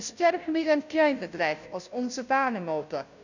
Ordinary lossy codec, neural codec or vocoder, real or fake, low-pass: none; codec, 16 kHz, 0.5 kbps, FunCodec, trained on Chinese and English, 25 frames a second; fake; 7.2 kHz